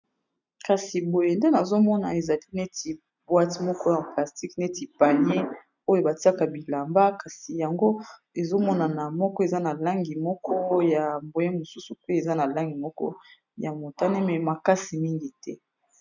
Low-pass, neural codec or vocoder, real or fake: 7.2 kHz; none; real